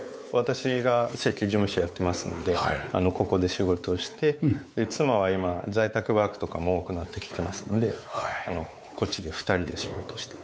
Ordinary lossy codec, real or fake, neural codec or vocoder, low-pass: none; fake; codec, 16 kHz, 4 kbps, X-Codec, WavLM features, trained on Multilingual LibriSpeech; none